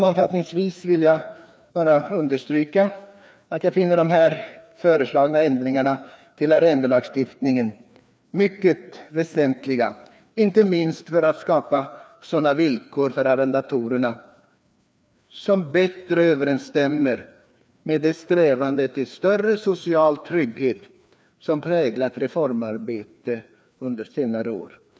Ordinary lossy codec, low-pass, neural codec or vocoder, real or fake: none; none; codec, 16 kHz, 2 kbps, FreqCodec, larger model; fake